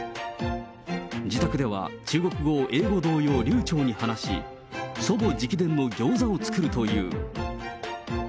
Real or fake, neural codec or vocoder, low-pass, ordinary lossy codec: real; none; none; none